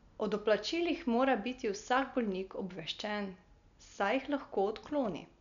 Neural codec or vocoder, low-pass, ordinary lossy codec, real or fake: none; 7.2 kHz; none; real